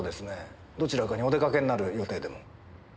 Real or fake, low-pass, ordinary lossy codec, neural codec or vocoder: real; none; none; none